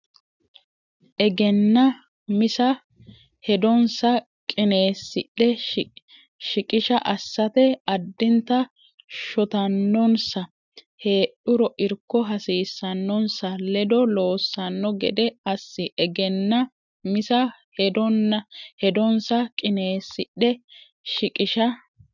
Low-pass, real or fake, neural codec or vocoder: 7.2 kHz; real; none